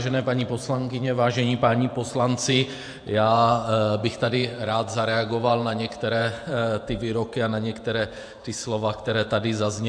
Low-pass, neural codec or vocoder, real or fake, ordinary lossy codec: 9.9 kHz; vocoder, 48 kHz, 128 mel bands, Vocos; fake; AAC, 64 kbps